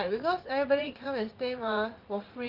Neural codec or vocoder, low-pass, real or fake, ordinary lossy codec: vocoder, 44.1 kHz, 80 mel bands, Vocos; 5.4 kHz; fake; Opus, 32 kbps